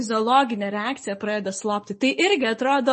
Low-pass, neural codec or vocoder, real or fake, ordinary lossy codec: 10.8 kHz; none; real; MP3, 32 kbps